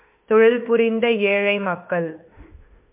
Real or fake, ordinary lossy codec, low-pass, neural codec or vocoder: fake; MP3, 32 kbps; 3.6 kHz; autoencoder, 48 kHz, 32 numbers a frame, DAC-VAE, trained on Japanese speech